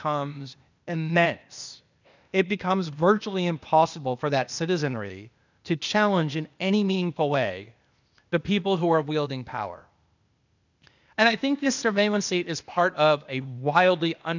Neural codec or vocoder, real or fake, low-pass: codec, 16 kHz, 0.8 kbps, ZipCodec; fake; 7.2 kHz